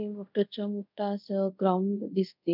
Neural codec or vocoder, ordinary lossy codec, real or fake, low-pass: codec, 24 kHz, 0.5 kbps, DualCodec; none; fake; 5.4 kHz